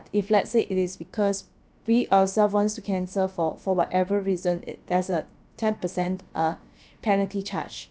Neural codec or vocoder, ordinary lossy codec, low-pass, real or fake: codec, 16 kHz, about 1 kbps, DyCAST, with the encoder's durations; none; none; fake